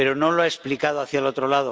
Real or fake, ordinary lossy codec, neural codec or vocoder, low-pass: real; none; none; none